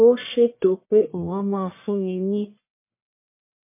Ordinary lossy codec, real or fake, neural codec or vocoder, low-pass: MP3, 24 kbps; fake; codec, 44.1 kHz, 1.7 kbps, Pupu-Codec; 3.6 kHz